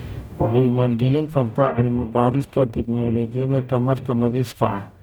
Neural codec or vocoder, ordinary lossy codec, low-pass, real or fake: codec, 44.1 kHz, 0.9 kbps, DAC; none; none; fake